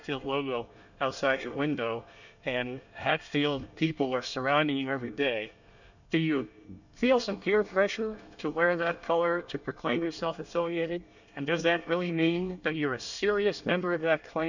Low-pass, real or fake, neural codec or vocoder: 7.2 kHz; fake; codec, 24 kHz, 1 kbps, SNAC